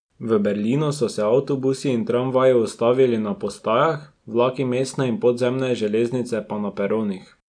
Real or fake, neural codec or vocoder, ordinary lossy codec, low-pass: real; none; none; 9.9 kHz